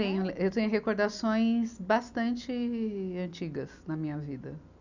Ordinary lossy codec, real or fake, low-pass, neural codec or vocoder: none; real; 7.2 kHz; none